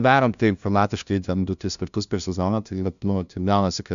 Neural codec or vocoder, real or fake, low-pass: codec, 16 kHz, 0.5 kbps, FunCodec, trained on LibriTTS, 25 frames a second; fake; 7.2 kHz